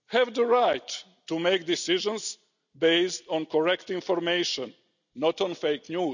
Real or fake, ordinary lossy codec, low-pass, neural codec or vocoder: real; none; 7.2 kHz; none